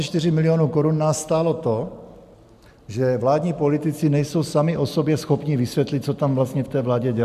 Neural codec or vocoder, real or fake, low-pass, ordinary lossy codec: none; real; 14.4 kHz; MP3, 96 kbps